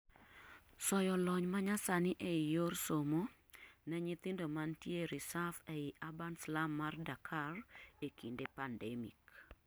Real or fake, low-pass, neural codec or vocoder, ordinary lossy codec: real; none; none; none